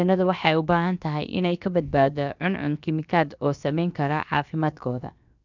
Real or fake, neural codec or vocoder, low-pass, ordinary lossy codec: fake; codec, 16 kHz, about 1 kbps, DyCAST, with the encoder's durations; 7.2 kHz; none